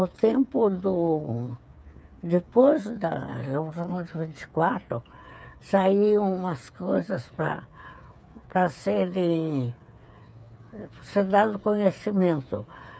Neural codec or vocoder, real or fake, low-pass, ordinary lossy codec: codec, 16 kHz, 8 kbps, FreqCodec, smaller model; fake; none; none